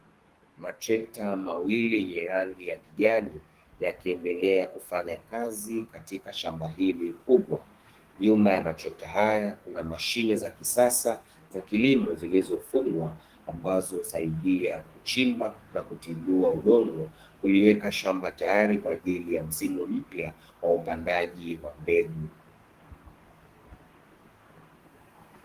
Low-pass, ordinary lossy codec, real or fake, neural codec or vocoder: 14.4 kHz; Opus, 24 kbps; fake; codec, 32 kHz, 1.9 kbps, SNAC